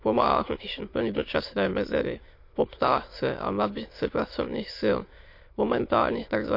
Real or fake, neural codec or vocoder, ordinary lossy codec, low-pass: fake; autoencoder, 22.05 kHz, a latent of 192 numbers a frame, VITS, trained on many speakers; MP3, 32 kbps; 5.4 kHz